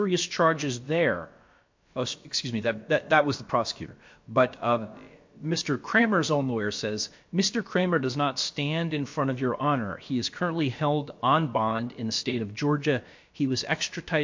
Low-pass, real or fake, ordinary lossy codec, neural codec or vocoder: 7.2 kHz; fake; MP3, 48 kbps; codec, 16 kHz, about 1 kbps, DyCAST, with the encoder's durations